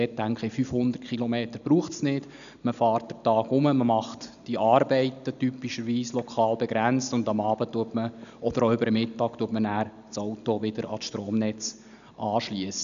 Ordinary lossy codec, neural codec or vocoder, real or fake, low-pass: none; none; real; 7.2 kHz